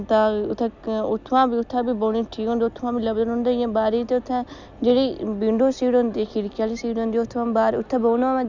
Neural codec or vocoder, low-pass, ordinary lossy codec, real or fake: none; 7.2 kHz; none; real